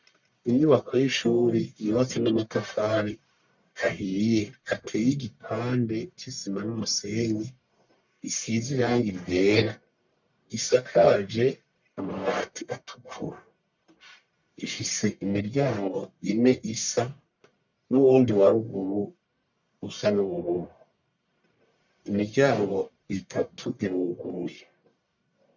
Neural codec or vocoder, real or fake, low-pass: codec, 44.1 kHz, 1.7 kbps, Pupu-Codec; fake; 7.2 kHz